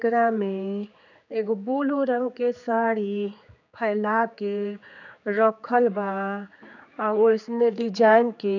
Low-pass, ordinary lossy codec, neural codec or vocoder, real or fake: 7.2 kHz; none; codec, 16 kHz, 4 kbps, X-Codec, HuBERT features, trained on general audio; fake